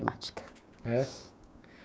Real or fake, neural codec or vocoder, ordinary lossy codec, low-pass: fake; codec, 16 kHz, 6 kbps, DAC; none; none